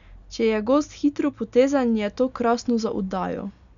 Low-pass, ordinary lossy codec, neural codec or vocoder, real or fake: 7.2 kHz; none; none; real